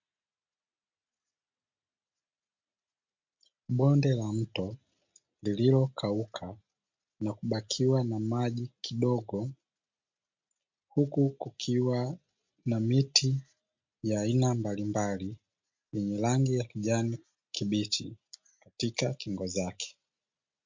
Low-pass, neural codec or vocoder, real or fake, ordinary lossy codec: 7.2 kHz; none; real; MP3, 48 kbps